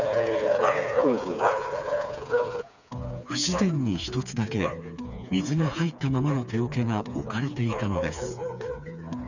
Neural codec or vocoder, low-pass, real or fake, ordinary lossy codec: codec, 16 kHz, 4 kbps, FreqCodec, smaller model; 7.2 kHz; fake; none